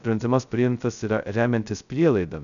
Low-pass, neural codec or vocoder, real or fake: 7.2 kHz; codec, 16 kHz, 0.2 kbps, FocalCodec; fake